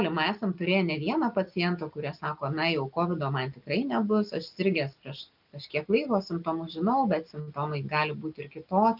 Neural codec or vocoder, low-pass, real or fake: none; 5.4 kHz; real